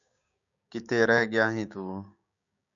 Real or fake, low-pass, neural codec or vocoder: fake; 7.2 kHz; codec, 16 kHz, 6 kbps, DAC